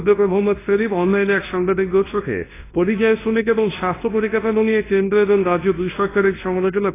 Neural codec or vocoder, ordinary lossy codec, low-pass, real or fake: codec, 24 kHz, 0.9 kbps, WavTokenizer, large speech release; AAC, 16 kbps; 3.6 kHz; fake